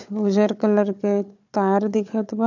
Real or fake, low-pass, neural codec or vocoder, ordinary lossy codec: fake; 7.2 kHz; codec, 16 kHz, 4 kbps, FunCodec, trained on Chinese and English, 50 frames a second; none